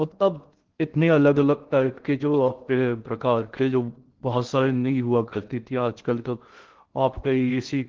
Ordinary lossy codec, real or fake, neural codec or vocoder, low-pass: Opus, 16 kbps; fake; codec, 16 kHz in and 24 kHz out, 0.6 kbps, FocalCodec, streaming, 2048 codes; 7.2 kHz